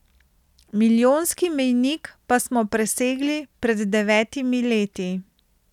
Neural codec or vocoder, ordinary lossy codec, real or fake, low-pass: none; none; real; 19.8 kHz